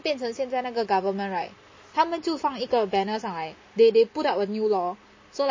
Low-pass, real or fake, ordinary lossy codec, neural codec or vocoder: 7.2 kHz; real; MP3, 32 kbps; none